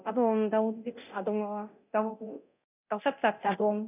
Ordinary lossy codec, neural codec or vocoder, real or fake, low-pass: none; codec, 24 kHz, 0.9 kbps, DualCodec; fake; 3.6 kHz